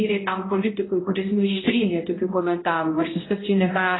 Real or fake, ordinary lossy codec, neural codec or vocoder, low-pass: fake; AAC, 16 kbps; codec, 16 kHz, 0.5 kbps, X-Codec, HuBERT features, trained on balanced general audio; 7.2 kHz